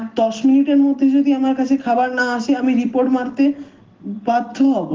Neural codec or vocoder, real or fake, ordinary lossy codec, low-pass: none; real; Opus, 16 kbps; 7.2 kHz